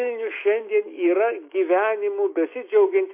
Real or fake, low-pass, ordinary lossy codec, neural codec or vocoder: fake; 3.6 kHz; MP3, 24 kbps; autoencoder, 48 kHz, 128 numbers a frame, DAC-VAE, trained on Japanese speech